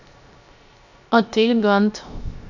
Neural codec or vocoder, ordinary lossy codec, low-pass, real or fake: codec, 16 kHz, 0.3 kbps, FocalCodec; none; 7.2 kHz; fake